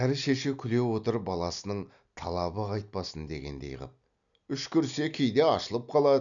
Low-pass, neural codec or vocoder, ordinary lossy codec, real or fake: 7.2 kHz; none; none; real